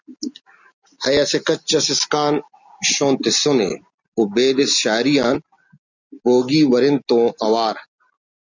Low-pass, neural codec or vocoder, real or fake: 7.2 kHz; none; real